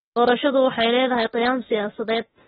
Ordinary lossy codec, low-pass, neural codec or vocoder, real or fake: AAC, 16 kbps; 19.8 kHz; autoencoder, 48 kHz, 32 numbers a frame, DAC-VAE, trained on Japanese speech; fake